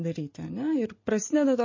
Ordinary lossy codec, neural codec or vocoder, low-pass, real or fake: MP3, 32 kbps; none; 7.2 kHz; real